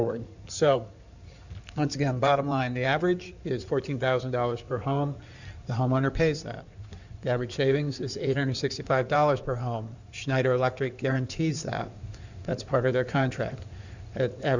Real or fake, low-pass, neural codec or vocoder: fake; 7.2 kHz; codec, 16 kHz in and 24 kHz out, 2.2 kbps, FireRedTTS-2 codec